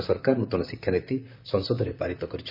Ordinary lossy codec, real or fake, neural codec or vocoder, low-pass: none; fake; vocoder, 44.1 kHz, 128 mel bands, Pupu-Vocoder; 5.4 kHz